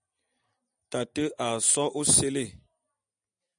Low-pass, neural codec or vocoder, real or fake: 9.9 kHz; none; real